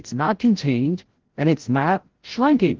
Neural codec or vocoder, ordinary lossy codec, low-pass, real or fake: codec, 16 kHz, 0.5 kbps, FreqCodec, larger model; Opus, 16 kbps; 7.2 kHz; fake